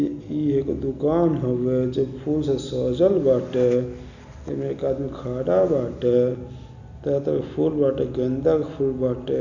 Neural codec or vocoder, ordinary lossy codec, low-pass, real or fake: none; none; 7.2 kHz; real